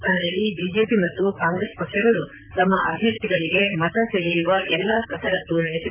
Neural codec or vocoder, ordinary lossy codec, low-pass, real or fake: vocoder, 44.1 kHz, 128 mel bands, Pupu-Vocoder; none; 3.6 kHz; fake